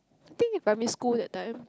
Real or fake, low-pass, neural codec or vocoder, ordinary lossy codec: real; none; none; none